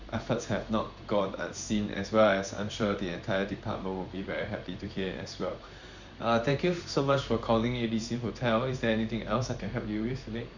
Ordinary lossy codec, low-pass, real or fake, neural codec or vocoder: none; 7.2 kHz; fake; codec, 16 kHz in and 24 kHz out, 1 kbps, XY-Tokenizer